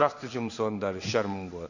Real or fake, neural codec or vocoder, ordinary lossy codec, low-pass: fake; codec, 16 kHz in and 24 kHz out, 1 kbps, XY-Tokenizer; none; 7.2 kHz